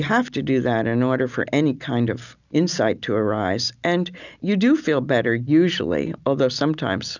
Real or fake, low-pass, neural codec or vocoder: real; 7.2 kHz; none